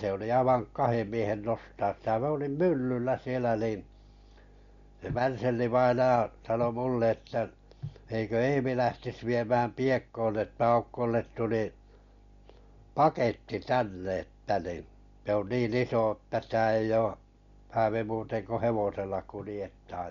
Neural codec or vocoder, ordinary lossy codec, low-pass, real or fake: none; MP3, 48 kbps; 7.2 kHz; real